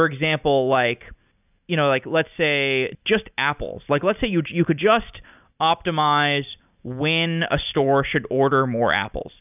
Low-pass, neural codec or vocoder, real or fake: 3.6 kHz; none; real